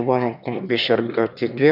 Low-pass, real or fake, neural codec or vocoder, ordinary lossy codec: 5.4 kHz; fake; autoencoder, 22.05 kHz, a latent of 192 numbers a frame, VITS, trained on one speaker; none